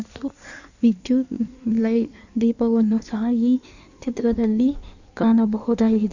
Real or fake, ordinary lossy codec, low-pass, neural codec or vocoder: fake; none; 7.2 kHz; codec, 16 kHz in and 24 kHz out, 1.1 kbps, FireRedTTS-2 codec